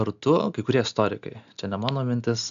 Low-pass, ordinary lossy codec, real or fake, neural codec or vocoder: 7.2 kHz; AAC, 96 kbps; real; none